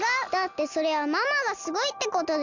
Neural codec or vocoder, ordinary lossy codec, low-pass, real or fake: none; Opus, 64 kbps; 7.2 kHz; real